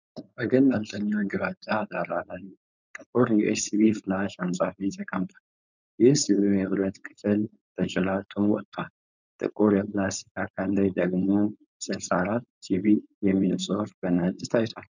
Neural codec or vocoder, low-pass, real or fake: codec, 16 kHz, 4.8 kbps, FACodec; 7.2 kHz; fake